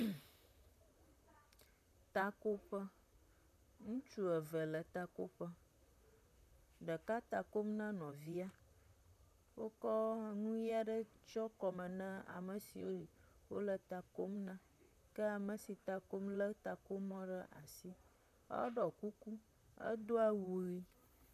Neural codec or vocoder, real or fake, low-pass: vocoder, 44.1 kHz, 128 mel bands, Pupu-Vocoder; fake; 14.4 kHz